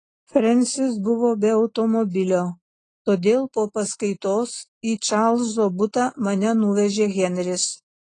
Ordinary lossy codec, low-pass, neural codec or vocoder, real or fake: AAC, 32 kbps; 9.9 kHz; none; real